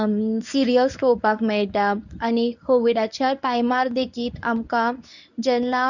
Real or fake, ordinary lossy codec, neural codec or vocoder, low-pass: fake; none; codec, 24 kHz, 0.9 kbps, WavTokenizer, medium speech release version 1; 7.2 kHz